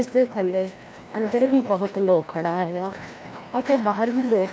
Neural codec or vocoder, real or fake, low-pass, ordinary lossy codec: codec, 16 kHz, 1 kbps, FreqCodec, larger model; fake; none; none